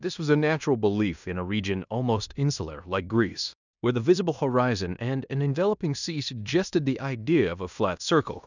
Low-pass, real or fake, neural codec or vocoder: 7.2 kHz; fake; codec, 16 kHz in and 24 kHz out, 0.9 kbps, LongCat-Audio-Codec, fine tuned four codebook decoder